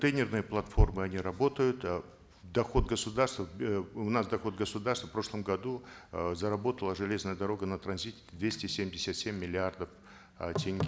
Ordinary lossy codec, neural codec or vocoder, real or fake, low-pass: none; none; real; none